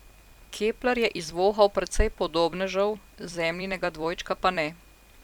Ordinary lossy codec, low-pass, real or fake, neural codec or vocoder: none; 19.8 kHz; fake; vocoder, 44.1 kHz, 128 mel bands every 256 samples, BigVGAN v2